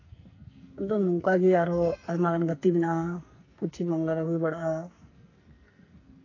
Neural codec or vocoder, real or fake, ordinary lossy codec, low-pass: codec, 44.1 kHz, 2.6 kbps, SNAC; fake; AAC, 32 kbps; 7.2 kHz